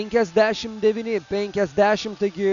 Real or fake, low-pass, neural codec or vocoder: real; 7.2 kHz; none